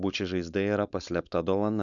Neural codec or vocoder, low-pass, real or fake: codec, 16 kHz, 4.8 kbps, FACodec; 7.2 kHz; fake